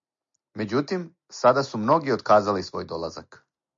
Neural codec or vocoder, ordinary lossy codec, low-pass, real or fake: none; MP3, 48 kbps; 7.2 kHz; real